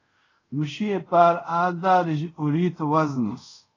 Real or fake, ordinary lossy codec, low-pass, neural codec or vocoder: fake; AAC, 32 kbps; 7.2 kHz; codec, 24 kHz, 0.5 kbps, DualCodec